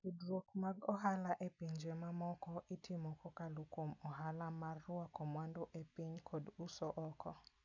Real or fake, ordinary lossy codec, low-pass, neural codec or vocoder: real; none; 7.2 kHz; none